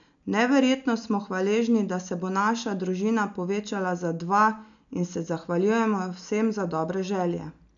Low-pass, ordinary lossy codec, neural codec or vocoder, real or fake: 7.2 kHz; none; none; real